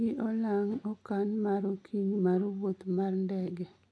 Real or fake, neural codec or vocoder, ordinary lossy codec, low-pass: real; none; none; none